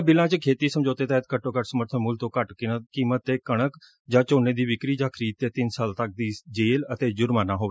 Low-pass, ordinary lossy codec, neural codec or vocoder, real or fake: none; none; none; real